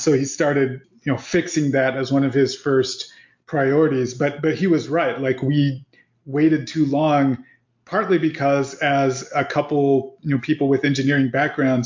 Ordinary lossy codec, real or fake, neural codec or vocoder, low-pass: MP3, 48 kbps; real; none; 7.2 kHz